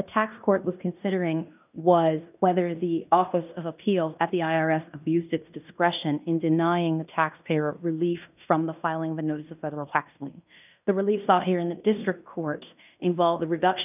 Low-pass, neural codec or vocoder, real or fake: 3.6 kHz; codec, 16 kHz in and 24 kHz out, 0.9 kbps, LongCat-Audio-Codec, fine tuned four codebook decoder; fake